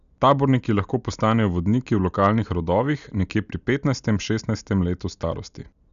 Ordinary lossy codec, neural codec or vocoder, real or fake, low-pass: none; none; real; 7.2 kHz